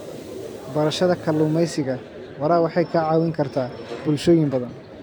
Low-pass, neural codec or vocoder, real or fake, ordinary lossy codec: none; vocoder, 44.1 kHz, 128 mel bands every 256 samples, BigVGAN v2; fake; none